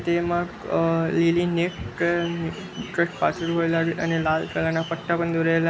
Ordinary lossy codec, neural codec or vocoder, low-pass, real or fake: none; none; none; real